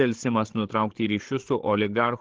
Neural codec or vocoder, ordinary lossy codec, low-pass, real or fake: codec, 16 kHz, 8 kbps, FreqCodec, larger model; Opus, 16 kbps; 7.2 kHz; fake